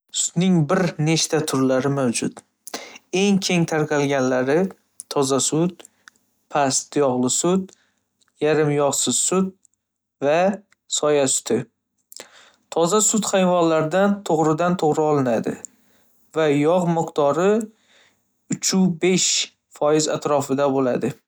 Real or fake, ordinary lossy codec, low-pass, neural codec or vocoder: real; none; none; none